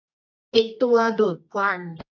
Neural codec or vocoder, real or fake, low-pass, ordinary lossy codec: codec, 24 kHz, 0.9 kbps, WavTokenizer, medium music audio release; fake; 7.2 kHz; none